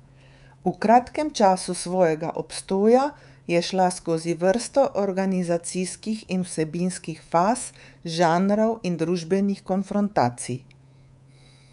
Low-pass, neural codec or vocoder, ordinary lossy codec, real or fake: 10.8 kHz; codec, 24 kHz, 3.1 kbps, DualCodec; none; fake